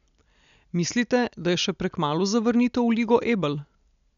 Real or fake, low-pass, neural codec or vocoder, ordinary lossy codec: real; 7.2 kHz; none; none